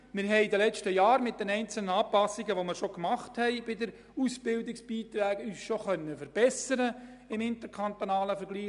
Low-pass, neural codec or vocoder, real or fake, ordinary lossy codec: 10.8 kHz; none; real; MP3, 96 kbps